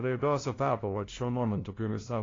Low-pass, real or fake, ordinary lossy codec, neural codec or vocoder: 7.2 kHz; fake; AAC, 32 kbps; codec, 16 kHz, 0.5 kbps, FunCodec, trained on LibriTTS, 25 frames a second